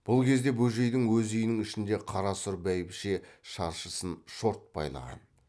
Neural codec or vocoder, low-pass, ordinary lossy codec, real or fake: none; none; none; real